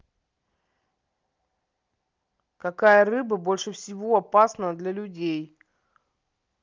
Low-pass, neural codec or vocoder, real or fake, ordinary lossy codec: 7.2 kHz; none; real; Opus, 24 kbps